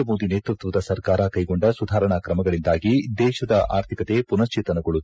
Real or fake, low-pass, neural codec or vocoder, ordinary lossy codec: real; none; none; none